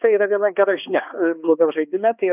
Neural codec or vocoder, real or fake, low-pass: codec, 16 kHz, 2 kbps, X-Codec, HuBERT features, trained on balanced general audio; fake; 3.6 kHz